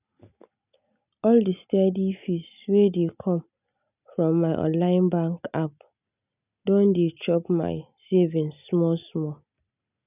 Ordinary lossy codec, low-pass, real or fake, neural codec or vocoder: none; 3.6 kHz; real; none